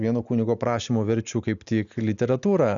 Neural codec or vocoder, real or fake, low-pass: none; real; 7.2 kHz